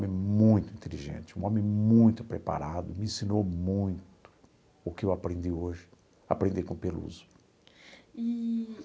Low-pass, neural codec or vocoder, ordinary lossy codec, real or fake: none; none; none; real